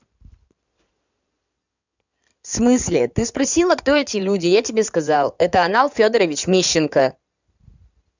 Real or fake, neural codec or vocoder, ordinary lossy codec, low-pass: fake; codec, 16 kHz in and 24 kHz out, 2.2 kbps, FireRedTTS-2 codec; none; 7.2 kHz